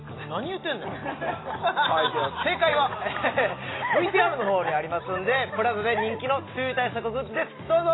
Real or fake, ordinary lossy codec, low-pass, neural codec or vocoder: real; AAC, 16 kbps; 7.2 kHz; none